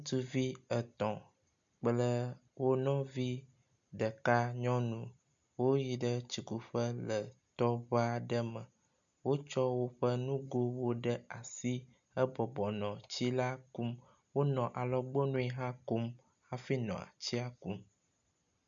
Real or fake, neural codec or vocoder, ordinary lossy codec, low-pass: real; none; MP3, 64 kbps; 7.2 kHz